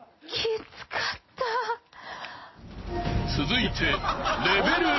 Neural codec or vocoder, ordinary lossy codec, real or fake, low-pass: none; MP3, 24 kbps; real; 7.2 kHz